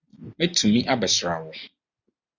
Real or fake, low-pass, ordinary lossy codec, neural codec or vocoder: real; 7.2 kHz; Opus, 64 kbps; none